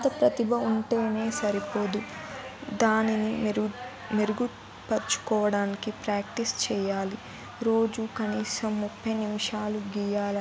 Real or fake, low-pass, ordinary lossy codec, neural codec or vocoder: real; none; none; none